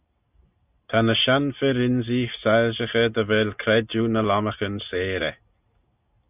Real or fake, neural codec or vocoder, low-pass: real; none; 3.6 kHz